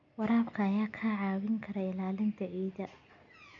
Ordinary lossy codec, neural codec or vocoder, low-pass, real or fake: none; none; 7.2 kHz; real